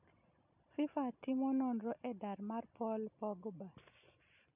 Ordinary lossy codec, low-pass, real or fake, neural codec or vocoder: none; 3.6 kHz; real; none